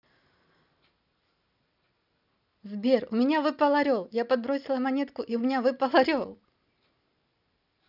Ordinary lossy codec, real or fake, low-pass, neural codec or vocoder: none; real; 5.4 kHz; none